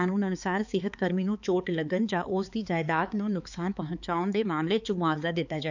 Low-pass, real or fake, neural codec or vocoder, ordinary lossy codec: 7.2 kHz; fake; codec, 16 kHz, 4 kbps, X-Codec, HuBERT features, trained on balanced general audio; none